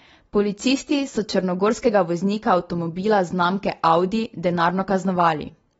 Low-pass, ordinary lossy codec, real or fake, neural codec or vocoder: 10.8 kHz; AAC, 24 kbps; real; none